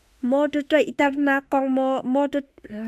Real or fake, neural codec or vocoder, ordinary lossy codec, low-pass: fake; autoencoder, 48 kHz, 32 numbers a frame, DAC-VAE, trained on Japanese speech; AAC, 96 kbps; 14.4 kHz